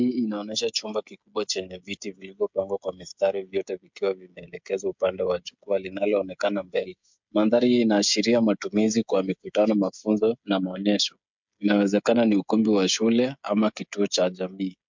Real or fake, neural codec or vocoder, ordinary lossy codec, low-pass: fake; codec, 16 kHz, 16 kbps, FreqCodec, smaller model; MP3, 64 kbps; 7.2 kHz